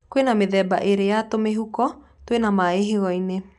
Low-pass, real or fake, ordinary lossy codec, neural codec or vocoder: 10.8 kHz; real; none; none